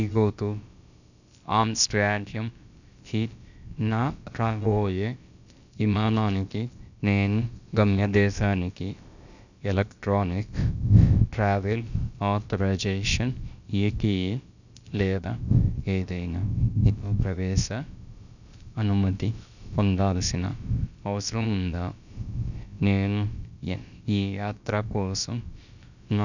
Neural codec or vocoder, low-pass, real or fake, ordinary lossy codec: codec, 16 kHz, about 1 kbps, DyCAST, with the encoder's durations; 7.2 kHz; fake; none